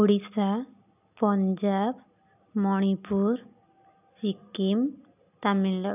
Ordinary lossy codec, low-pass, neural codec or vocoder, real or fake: none; 3.6 kHz; none; real